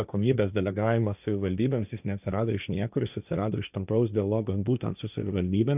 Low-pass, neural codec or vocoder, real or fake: 3.6 kHz; codec, 16 kHz, 1.1 kbps, Voila-Tokenizer; fake